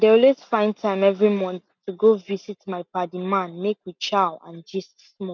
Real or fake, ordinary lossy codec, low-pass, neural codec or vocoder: real; none; 7.2 kHz; none